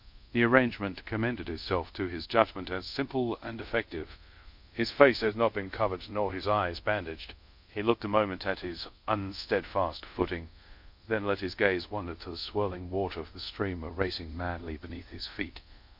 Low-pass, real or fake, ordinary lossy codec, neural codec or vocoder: 5.4 kHz; fake; MP3, 48 kbps; codec, 24 kHz, 0.5 kbps, DualCodec